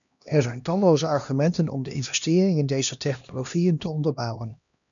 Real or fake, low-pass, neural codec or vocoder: fake; 7.2 kHz; codec, 16 kHz, 1 kbps, X-Codec, HuBERT features, trained on LibriSpeech